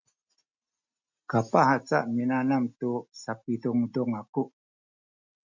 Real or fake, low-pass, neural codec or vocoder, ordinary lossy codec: real; 7.2 kHz; none; MP3, 64 kbps